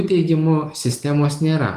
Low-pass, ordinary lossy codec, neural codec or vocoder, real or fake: 14.4 kHz; Opus, 24 kbps; none; real